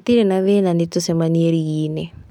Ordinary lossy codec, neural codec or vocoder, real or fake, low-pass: none; none; real; 19.8 kHz